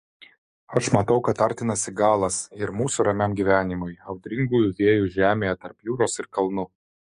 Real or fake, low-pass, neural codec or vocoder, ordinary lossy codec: fake; 14.4 kHz; codec, 44.1 kHz, 7.8 kbps, DAC; MP3, 48 kbps